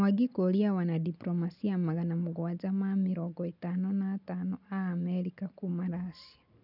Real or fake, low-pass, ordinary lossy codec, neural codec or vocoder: real; 5.4 kHz; none; none